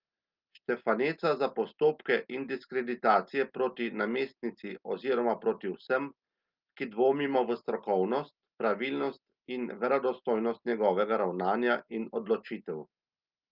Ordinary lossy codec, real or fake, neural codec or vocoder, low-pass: Opus, 24 kbps; real; none; 5.4 kHz